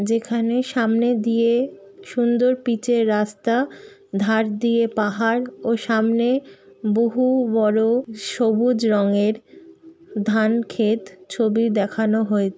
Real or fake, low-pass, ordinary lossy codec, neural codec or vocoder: real; none; none; none